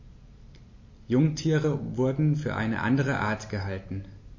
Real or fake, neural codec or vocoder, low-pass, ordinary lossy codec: real; none; 7.2 kHz; MP3, 32 kbps